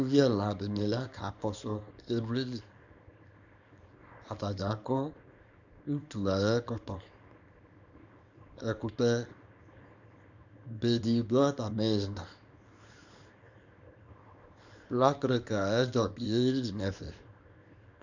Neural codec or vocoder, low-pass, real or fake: codec, 24 kHz, 0.9 kbps, WavTokenizer, small release; 7.2 kHz; fake